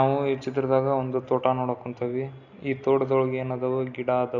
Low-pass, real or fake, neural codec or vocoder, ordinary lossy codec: 7.2 kHz; real; none; none